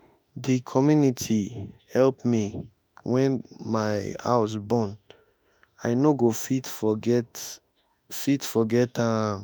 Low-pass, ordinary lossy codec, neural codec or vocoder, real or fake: none; none; autoencoder, 48 kHz, 32 numbers a frame, DAC-VAE, trained on Japanese speech; fake